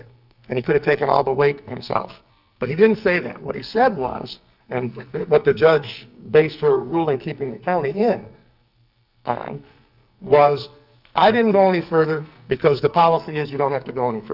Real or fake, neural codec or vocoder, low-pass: fake; codec, 44.1 kHz, 2.6 kbps, SNAC; 5.4 kHz